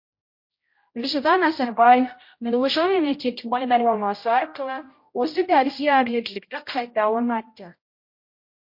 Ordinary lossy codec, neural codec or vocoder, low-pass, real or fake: MP3, 32 kbps; codec, 16 kHz, 0.5 kbps, X-Codec, HuBERT features, trained on general audio; 5.4 kHz; fake